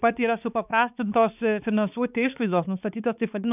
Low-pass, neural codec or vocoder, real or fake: 3.6 kHz; codec, 16 kHz, 4 kbps, X-Codec, HuBERT features, trained on balanced general audio; fake